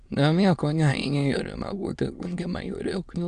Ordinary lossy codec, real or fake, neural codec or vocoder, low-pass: Opus, 64 kbps; fake; autoencoder, 22.05 kHz, a latent of 192 numbers a frame, VITS, trained on many speakers; 9.9 kHz